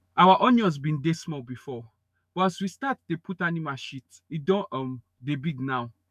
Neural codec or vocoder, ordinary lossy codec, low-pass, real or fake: autoencoder, 48 kHz, 128 numbers a frame, DAC-VAE, trained on Japanese speech; none; 14.4 kHz; fake